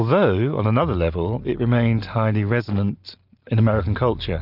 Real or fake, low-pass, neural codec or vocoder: real; 5.4 kHz; none